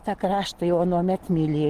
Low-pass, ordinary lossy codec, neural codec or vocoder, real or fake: 14.4 kHz; Opus, 16 kbps; none; real